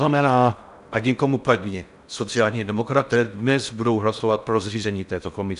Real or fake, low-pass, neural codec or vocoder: fake; 10.8 kHz; codec, 16 kHz in and 24 kHz out, 0.6 kbps, FocalCodec, streaming, 4096 codes